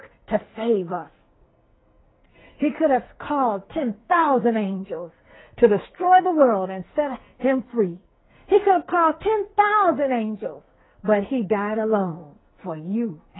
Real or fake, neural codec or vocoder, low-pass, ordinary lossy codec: fake; codec, 44.1 kHz, 2.6 kbps, SNAC; 7.2 kHz; AAC, 16 kbps